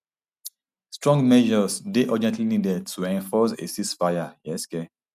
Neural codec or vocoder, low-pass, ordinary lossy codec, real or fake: none; 14.4 kHz; none; real